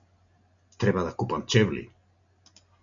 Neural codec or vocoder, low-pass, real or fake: none; 7.2 kHz; real